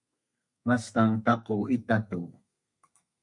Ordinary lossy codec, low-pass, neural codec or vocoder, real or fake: MP3, 64 kbps; 10.8 kHz; codec, 32 kHz, 1.9 kbps, SNAC; fake